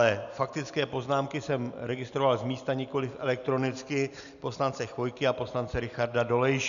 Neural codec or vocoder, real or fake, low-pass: none; real; 7.2 kHz